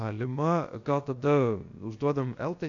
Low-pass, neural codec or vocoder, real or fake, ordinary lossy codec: 7.2 kHz; codec, 16 kHz, about 1 kbps, DyCAST, with the encoder's durations; fake; AAC, 48 kbps